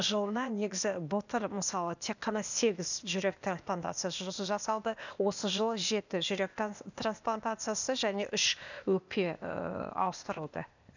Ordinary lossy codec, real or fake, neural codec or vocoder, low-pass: none; fake; codec, 16 kHz, 0.8 kbps, ZipCodec; 7.2 kHz